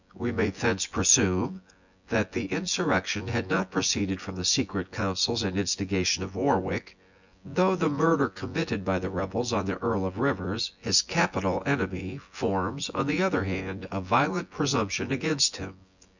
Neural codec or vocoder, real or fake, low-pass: vocoder, 24 kHz, 100 mel bands, Vocos; fake; 7.2 kHz